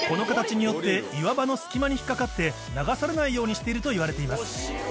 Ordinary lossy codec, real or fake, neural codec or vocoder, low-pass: none; real; none; none